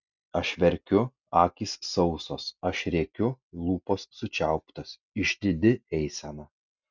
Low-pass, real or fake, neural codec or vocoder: 7.2 kHz; real; none